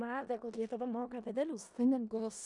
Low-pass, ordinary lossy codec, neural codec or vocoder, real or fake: 10.8 kHz; MP3, 96 kbps; codec, 16 kHz in and 24 kHz out, 0.4 kbps, LongCat-Audio-Codec, four codebook decoder; fake